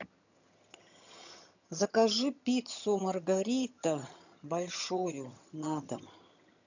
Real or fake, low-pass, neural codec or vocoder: fake; 7.2 kHz; vocoder, 22.05 kHz, 80 mel bands, HiFi-GAN